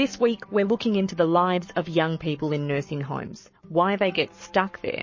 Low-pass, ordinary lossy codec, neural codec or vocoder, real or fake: 7.2 kHz; MP3, 32 kbps; codec, 44.1 kHz, 7.8 kbps, Pupu-Codec; fake